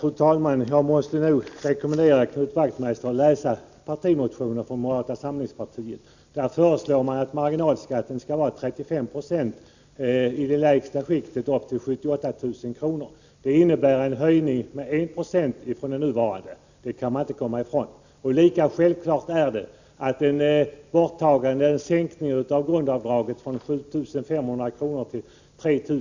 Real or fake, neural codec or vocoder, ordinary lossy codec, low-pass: real; none; none; 7.2 kHz